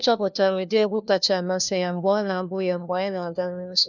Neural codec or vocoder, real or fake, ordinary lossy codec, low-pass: codec, 16 kHz, 1 kbps, FunCodec, trained on LibriTTS, 50 frames a second; fake; none; 7.2 kHz